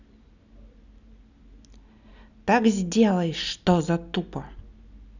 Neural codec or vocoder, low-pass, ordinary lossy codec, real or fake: none; 7.2 kHz; none; real